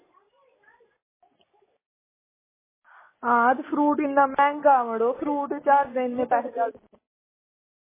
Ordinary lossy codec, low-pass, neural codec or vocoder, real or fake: MP3, 16 kbps; 3.6 kHz; none; real